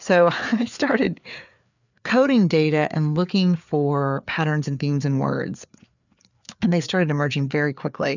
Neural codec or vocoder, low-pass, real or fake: codec, 16 kHz, 4 kbps, FreqCodec, larger model; 7.2 kHz; fake